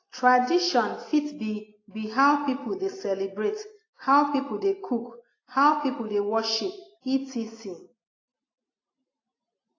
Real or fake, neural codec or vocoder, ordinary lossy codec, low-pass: real; none; AAC, 32 kbps; 7.2 kHz